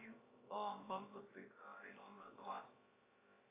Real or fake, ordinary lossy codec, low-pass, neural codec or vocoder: fake; AAC, 16 kbps; 3.6 kHz; codec, 16 kHz, about 1 kbps, DyCAST, with the encoder's durations